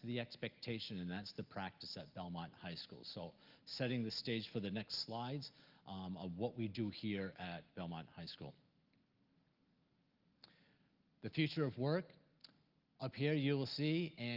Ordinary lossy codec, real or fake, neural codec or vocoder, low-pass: Opus, 32 kbps; real; none; 5.4 kHz